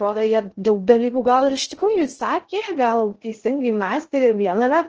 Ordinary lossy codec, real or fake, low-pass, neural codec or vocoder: Opus, 16 kbps; fake; 7.2 kHz; codec, 16 kHz in and 24 kHz out, 0.6 kbps, FocalCodec, streaming, 2048 codes